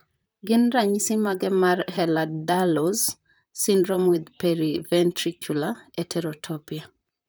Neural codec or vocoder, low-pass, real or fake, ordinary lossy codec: vocoder, 44.1 kHz, 128 mel bands, Pupu-Vocoder; none; fake; none